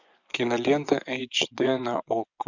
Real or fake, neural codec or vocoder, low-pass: fake; vocoder, 24 kHz, 100 mel bands, Vocos; 7.2 kHz